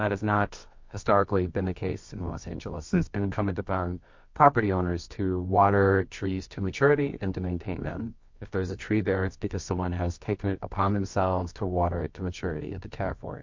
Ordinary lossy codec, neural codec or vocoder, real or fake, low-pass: MP3, 48 kbps; codec, 24 kHz, 0.9 kbps, WavTokenizer, medium music audio release; fake; 7.2 kHz